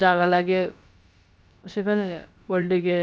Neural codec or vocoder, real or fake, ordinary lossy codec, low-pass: codec, 16 kHz, about 1 kbps, DyCAST, with the encoder's durations; fake; none; none